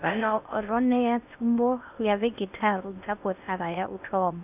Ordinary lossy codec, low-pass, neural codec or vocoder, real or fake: none; 3.6 kHz; codec, 16 kHz in and 24 kHz out, 0.6 kbps, FocalCodec, streaming, 4096 codes; fake